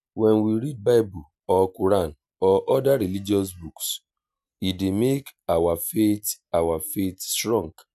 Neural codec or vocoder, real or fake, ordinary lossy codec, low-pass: none; real; none; 14.4 kHz